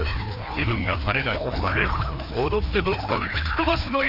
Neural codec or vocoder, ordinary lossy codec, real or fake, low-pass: codec, 16 kHz, 2 kbps, FreqCodec, larger model; none; fake; 5.4 kHz